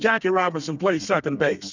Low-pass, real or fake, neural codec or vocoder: 7.2 kHz; fake; codec, 32 kHz, 1.9 kbps, SNAC